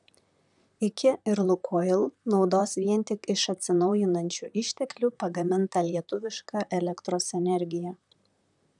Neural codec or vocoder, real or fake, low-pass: vocoder, 44.1 kHz, 128 mel bands, Pupu-Vocoder; fake; 10.8 kHz